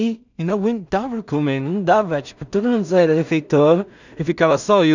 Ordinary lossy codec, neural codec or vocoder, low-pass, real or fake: none; codec, 16 kHz in and 24 kHz out, 0.4 kbps, LongCat-Audio-Codec, two codebook decoder; 7.2 kHz; fake